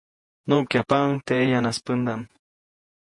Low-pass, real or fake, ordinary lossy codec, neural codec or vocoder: 10.8 kHz; fake; MP3, 48 kbps; vocoder, 48 kHz, 128 mel bands, Vocos